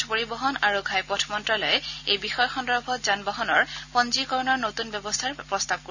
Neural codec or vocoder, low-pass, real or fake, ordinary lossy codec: none; 7.2 kHz; real; none